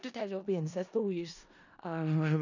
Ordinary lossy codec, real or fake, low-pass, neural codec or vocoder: none; fake; 7.2 kHz; codec, 16 kHz in and 24 kHz out, 0.4 kbps, LongCat-Audio-Codec, four codebook decoder